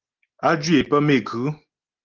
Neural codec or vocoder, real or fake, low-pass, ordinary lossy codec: none; real; 7.2 kHz; Opus, 16 kbps